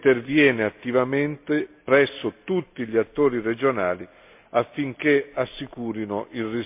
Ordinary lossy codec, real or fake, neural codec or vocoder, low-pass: MP3, 32 kbps; real; none; 3.6 kHz